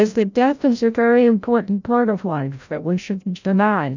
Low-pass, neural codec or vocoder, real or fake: 7.2 kHz; codec, 16 kHz, 0.5 kbps, FreqCodec, larger model; fake